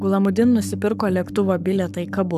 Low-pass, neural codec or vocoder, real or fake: 14.4 kHz; codec, 44.1 kHz, 7.8 kbps, Pupu-Codec; fake